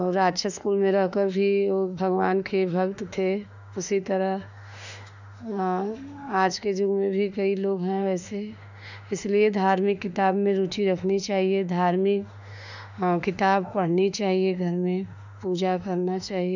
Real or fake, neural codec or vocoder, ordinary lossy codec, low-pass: fake; autoencoder, 48 kHz, 32 numbers a frame, DAC-VAE, trained on Japanese speech; none; 7.2 kHz